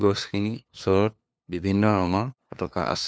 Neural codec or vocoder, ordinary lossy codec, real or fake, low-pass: codec, 16 kHz, 2 kbps, FunCodec, trained on LibriTTS, 25 frames a second; none; fake; none